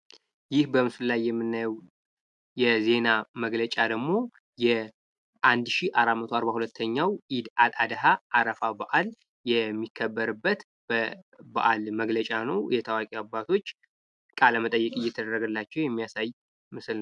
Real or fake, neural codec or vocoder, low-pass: real; none; 10.8 kHz